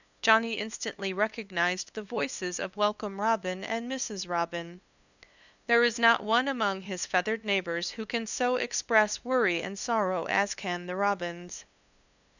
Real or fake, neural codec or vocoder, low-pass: fake; codec, 16 kHz, 2 kbps, FunCodec, trained on LibriTTS, 25 frames a second; 7.2 kHz